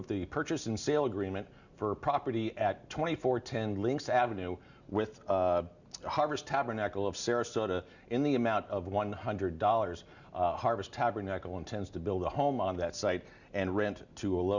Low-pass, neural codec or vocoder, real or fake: 7.2 kHz; none; real